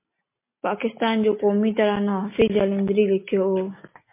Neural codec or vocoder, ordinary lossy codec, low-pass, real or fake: none; MP3, 24 kbps; 3.6 kHz; real